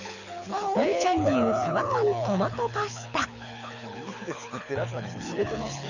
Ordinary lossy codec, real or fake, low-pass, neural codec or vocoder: none; fake; 7.2 kHz; codec, 24 kHz, 6 kbps, HILCodec